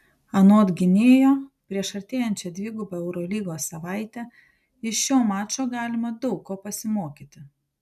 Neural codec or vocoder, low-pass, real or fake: none; 14.4 kHz; real